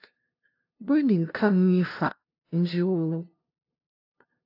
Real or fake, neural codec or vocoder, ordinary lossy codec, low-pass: fake; codec, 16 kHz, 0.5 kbps, FunCodec, trained on LibriTTS, 25 frames a second; AAC, 32 kbps; 5.4 kHz